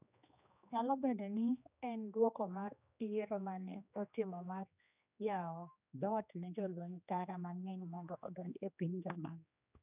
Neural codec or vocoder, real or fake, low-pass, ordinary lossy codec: codec, 16 kHz, 2 kbps, X-Codec, HuBERT features, trained on general audio; fake; 3.6 kHz; none